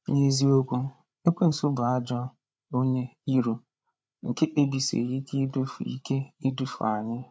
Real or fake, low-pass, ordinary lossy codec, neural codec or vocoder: fake; none; none; codec, 16 kHz, 8 kbps, FreqCodec, larger model